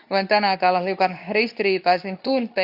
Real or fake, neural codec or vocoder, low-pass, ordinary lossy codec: fake; codec, 24 kHz, 0.9 kbps, WavTokenizer, medium speech release version 2; 5.4 kHz; none